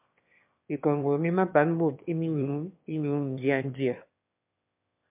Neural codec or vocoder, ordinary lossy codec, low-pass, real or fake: autoencoder, 22.05 kHz, a latent of 192 numbers a frame, VITS, trained on one speaker; MP3, 32 kbps; 3.6 kHz; fake